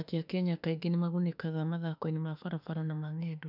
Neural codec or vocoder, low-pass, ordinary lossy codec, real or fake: autoencoder, 48 kHz, 32 numbers a frame, DAC-VAE, trained on Japanese speech; 5.4 kHz; none; fake